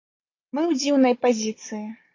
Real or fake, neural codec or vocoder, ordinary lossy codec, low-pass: real; none; AAC, 32 kbps; 7.2 kHz